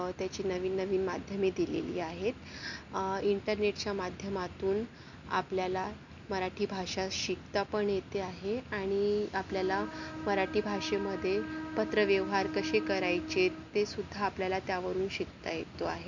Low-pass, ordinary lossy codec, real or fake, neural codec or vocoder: 7.2 kHz; none; real; none